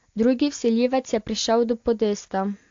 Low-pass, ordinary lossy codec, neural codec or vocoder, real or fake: 7.2 kHz; AAC, 48 kbps; none; real